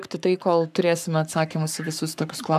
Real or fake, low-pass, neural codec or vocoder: fake; 14.4 kHz; codec, 44.1 kHz, 7.8 kbps, Pupu-Codec